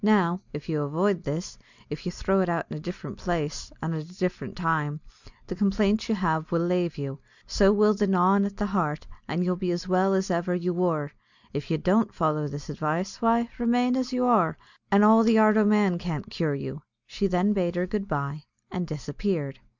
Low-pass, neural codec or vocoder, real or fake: 7.2 kHz; none; real